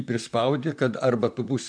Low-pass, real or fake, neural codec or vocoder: 9.9 kHz; fake; vocoder, 44.1 kHz, 128 mel bands, Pupu-Vocoder